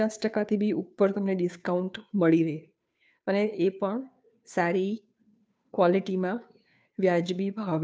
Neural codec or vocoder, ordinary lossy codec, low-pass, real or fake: codec, 16 kHz, 2 kbps, FunCodec, trained on Chinese and English, 25 frames a second; none; none; fake